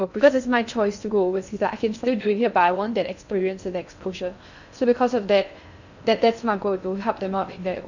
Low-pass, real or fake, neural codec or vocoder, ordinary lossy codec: 7.2 kHz; fake; codec, 16 kHz in and 24 kHz out, 0.6 kbps, FocalCodec, streaming, 2048 codes; none